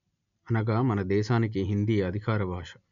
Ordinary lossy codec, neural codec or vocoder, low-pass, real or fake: none; none; 7.2 kHz; real